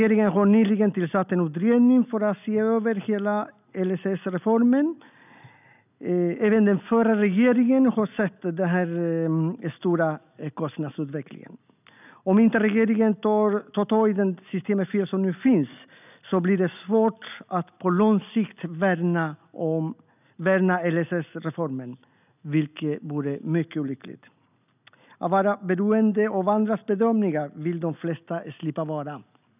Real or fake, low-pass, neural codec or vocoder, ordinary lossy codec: real; 3.6 kHz; none; none